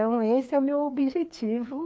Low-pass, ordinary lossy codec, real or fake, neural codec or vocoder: none; none; fake; codec, 16 kHz, 2 kbps, FreqCodec, larger model